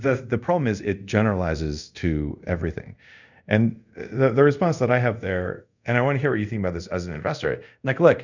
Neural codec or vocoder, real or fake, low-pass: codec, 24 kHz, 0.5 kbps, DualCodec; fake; 7.2 kHz